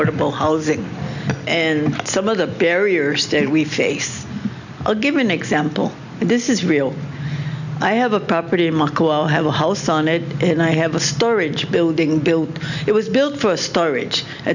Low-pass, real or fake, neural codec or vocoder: 7.2 kHz; real; none